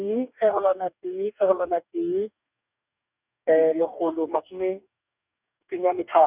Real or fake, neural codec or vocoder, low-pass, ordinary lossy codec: fake; codec, 44.1 kHz, 2.6 kbps, DAC; 3.6 kHz; none